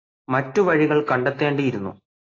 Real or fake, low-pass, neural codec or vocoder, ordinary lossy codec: real; 7.2 kHz; none; AAC, 32 kbps